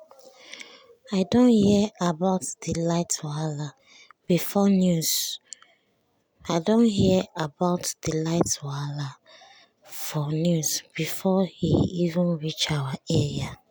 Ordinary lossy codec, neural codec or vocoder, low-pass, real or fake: none; none; none; real